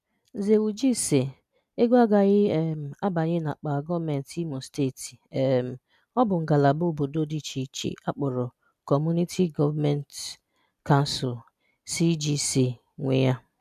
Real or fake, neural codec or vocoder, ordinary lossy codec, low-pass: real; none; none; 14.4 kHz